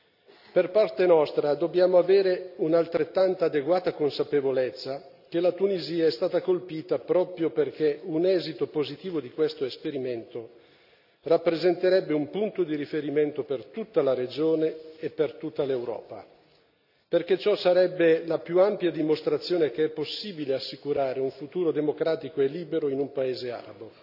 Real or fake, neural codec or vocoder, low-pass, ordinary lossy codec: real; none; 5.4 kHz; none